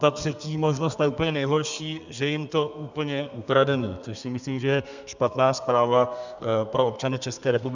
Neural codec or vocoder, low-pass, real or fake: codec, 32 kHz, 1.9 kbps, SNAC; 7.2 kHz; fake